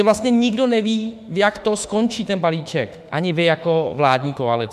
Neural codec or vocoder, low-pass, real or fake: autoencoder, 48 kHz, 32 numbers a frame, DAC-VAE, trained on Japanese speech; 14.4 kHz; fake